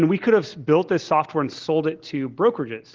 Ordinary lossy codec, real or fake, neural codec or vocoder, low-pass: Opus, 16 kbps; real; none; 7.2 kHz